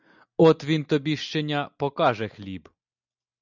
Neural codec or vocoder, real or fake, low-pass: none; real; 7.2 kHz